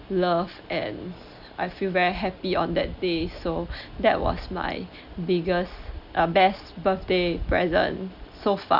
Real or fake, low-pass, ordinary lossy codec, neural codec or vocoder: real; 5.4 kHz; Opus, 64 kbps; none